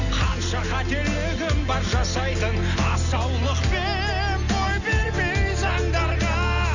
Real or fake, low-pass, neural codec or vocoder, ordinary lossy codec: real; 7.2 kHz; none; none